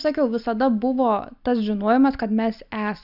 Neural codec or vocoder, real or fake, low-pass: none; real; 5.4 kHz